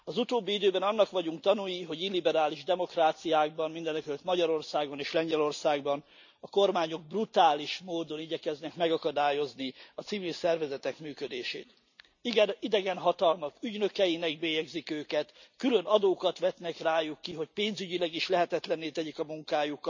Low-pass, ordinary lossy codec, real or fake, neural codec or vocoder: 7.2 kHz; none; real; none